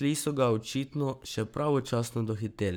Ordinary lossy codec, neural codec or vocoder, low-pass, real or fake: none; codec, 44.1 kHz, 7.8 kbps, Pupu-Codec; none; fake